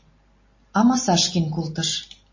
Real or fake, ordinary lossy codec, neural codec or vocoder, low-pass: real; MP3, 32 kbps; none; 7.2 kHz